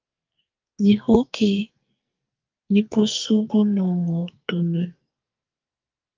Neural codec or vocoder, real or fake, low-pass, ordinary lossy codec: codec, 44.1 kHz, 2.6 kbps, SNAC; fake; 7.2 kHz; Opus, 24 kbps